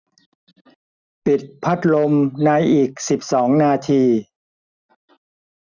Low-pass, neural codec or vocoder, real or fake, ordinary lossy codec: 7.2 kHz; none; real; none